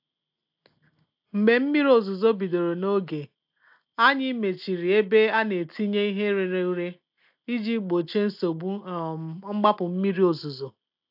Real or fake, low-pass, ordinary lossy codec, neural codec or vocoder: real; 5.4 kHz; AAC, 48 kbps; none